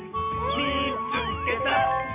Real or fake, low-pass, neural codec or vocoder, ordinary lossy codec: real; 3.6 kHz; none; MP3, 24 kbps